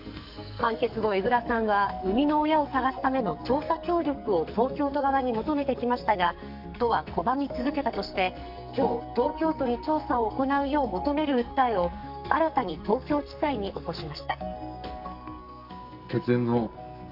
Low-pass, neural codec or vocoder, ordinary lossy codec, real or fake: 5.4 kHz; codec, 44.1 kHz, 2.6 kbps, SNAC; none; fake